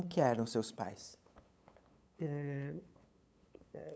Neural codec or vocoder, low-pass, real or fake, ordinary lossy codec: codec, 16 kHz, 8 kbps, FunCodec, trained on LibriTTS, 25 frames a second; none; fake; none